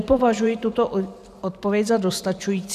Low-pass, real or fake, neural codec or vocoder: 14.4 kHz; fake; vocoder, 44.1 kHz, 128 mel bands every 512 samples, BigVGAN v2